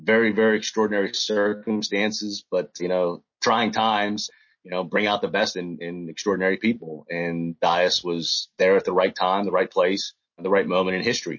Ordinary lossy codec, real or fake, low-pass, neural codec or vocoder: MP3, 32 kbps; real; 7.2 kHz; none